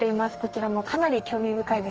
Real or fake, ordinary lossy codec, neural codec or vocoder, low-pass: fake; Opus, 24 kbps; codec, 32 kHz, 1.9 kbps, SNAC; 7.2 kHz